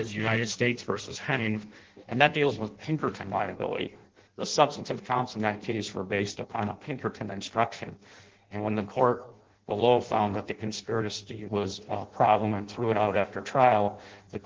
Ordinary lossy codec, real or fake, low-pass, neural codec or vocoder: Opus, 16 kbps; fake; 7.2 kHz; codec, 16 kHz in and 24 kHz out, 0.6 kbps, FireRedTTS-2 codec